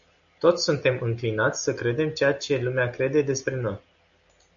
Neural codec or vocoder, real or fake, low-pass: none; real; 7.2 kHz